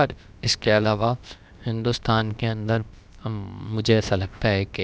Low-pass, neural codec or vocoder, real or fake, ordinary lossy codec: none; codec, 16 kHz, about 1 kbps, DyCAST, with the encoder's durations; fake; none